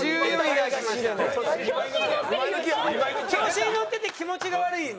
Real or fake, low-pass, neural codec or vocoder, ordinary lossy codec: real; none; none; none